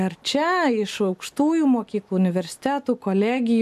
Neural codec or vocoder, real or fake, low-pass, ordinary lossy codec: none; real; 14.4 kHz; AAC, 96 kbps